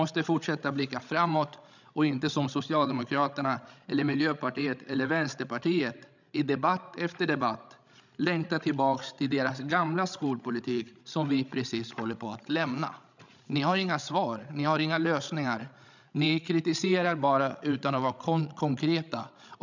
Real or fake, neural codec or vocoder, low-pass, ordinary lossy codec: fake; codec, 16 kHz, 16 kbps, FreqCodec, larger model; 7.2 kHz; none